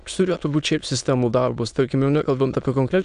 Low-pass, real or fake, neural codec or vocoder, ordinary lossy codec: 9.9 kHz; fake; autoencoder, 22.05 kHz, a latent of 192 numbers a frame, VITS, trained on many speakers; AAC, 96 kbps